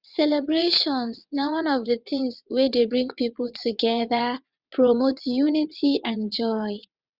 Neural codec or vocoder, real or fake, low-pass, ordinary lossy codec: vocoder, 22.05 kHz, 80 mel bands, WaveNeXt; fake; 5.4 kHz; Opus, 64 kbps